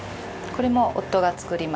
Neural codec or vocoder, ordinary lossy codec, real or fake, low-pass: none; none; real; none